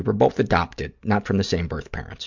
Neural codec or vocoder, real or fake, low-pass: none; real; 7.2 kHz